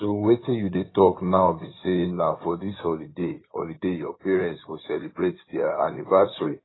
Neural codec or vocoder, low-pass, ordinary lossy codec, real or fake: codec, 16 kHz in and 24 kHz out, 2.2 kbps, FireRedTTS-2 codec; 7.2 kHz; AAC, 16 kbps; fake